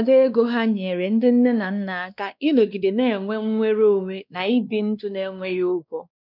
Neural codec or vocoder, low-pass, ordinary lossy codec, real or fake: codec, 16 kHz, 2 kbps, X-Codec, WavLM features, trained on Multilingual LibriSpeech; 5.4 kHz; none; fake